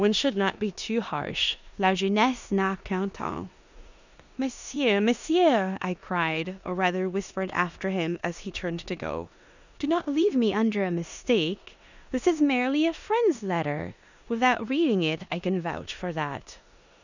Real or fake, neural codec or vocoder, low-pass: fake; codec, 16 kHz in and 24 kHz out, 0.9 kbps, LongCat-Audio-Codec, four codebook decoder; 7.2 kHz